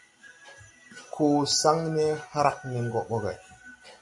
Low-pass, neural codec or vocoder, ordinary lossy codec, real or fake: 10.8 kHz; none; AAC, 64 kbps; real